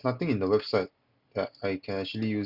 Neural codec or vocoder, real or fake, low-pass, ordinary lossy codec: none; real; 5.4 kHz; Opus, 64 kbps